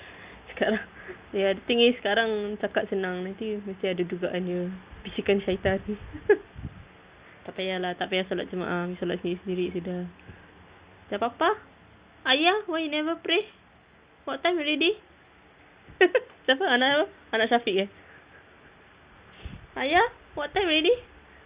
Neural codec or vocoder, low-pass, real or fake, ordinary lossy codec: none; 3.6 kHz; real; Opus, 64 kbps